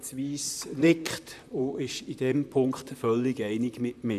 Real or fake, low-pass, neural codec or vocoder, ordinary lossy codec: fake; 14.4 kHz; vocoder, 44.1 kHz, 128 mel bands, Pupu-Vocoder; none